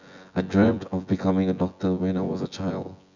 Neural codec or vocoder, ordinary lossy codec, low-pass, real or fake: vocoder, 24 kHz, 100 mel bands, Vocos; none; 7.2 kHz; fake